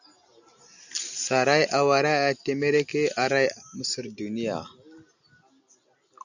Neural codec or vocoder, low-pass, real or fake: none; 7.2 kHz; real